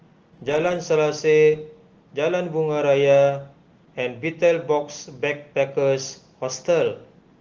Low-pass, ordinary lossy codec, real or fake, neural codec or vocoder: 7.2 kHz; Opus, 16 kbps; real; none